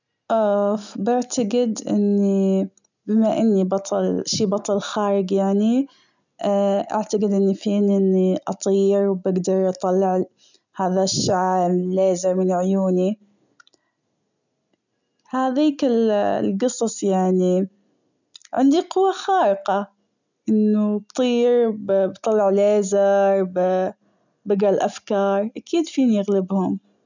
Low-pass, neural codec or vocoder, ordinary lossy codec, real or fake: 7.2 kHz; none; none; real